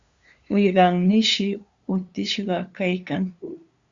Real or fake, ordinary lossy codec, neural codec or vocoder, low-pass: fake; Opus, 64 kbps; codec, 16 kHz, 2 kbps, FunCodec, trained on LibriTTS, 25 frames a second; 7.2 kHz